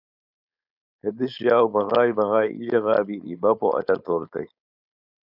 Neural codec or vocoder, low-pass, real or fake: codec, 16 kHz, 4.8 kbps, FACodec; 5.4 kHz; fake